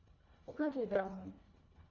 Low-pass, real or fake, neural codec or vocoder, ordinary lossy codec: 7.2 kHz; fake; codec, 24 kHz, 1.5 kbps, HILCodec; Opus, 64 kbps